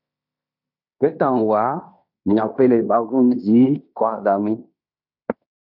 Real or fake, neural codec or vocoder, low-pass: fake; codec, 16 kHz in and 24 kHz out, 0.9 kbps, LongCat-Audio-Codec, fine tuned four codebook decoder; 5.4 kHz